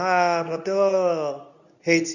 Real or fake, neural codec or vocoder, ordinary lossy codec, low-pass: fake; codec, 24 kHz, 0.9 kbps, WavTokenizer, medium speech release version 2; none; 7.2 kHz